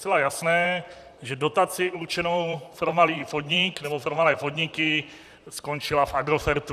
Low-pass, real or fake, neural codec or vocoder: 14.4 kHz; fake; vocoder, 44.1 kHz, 128 mel bands, Pupu-Vocoder